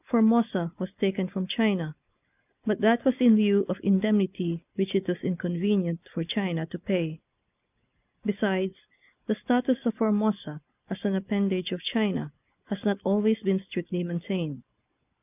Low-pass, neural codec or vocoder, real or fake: 3.6 kHz; none; real